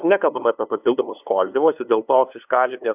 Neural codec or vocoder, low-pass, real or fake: codec, 16 kHz, 2 kbps, FunCodec, trained on LibriTTS, 25 frames a second; 3.6 kHz; fake